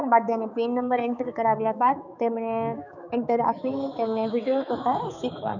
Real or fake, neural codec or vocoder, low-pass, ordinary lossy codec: fake; codec, 16 kHz, 4 kbps, X-Codec, HuBERT features, trained on balanced general audio; 7.2 kHz; none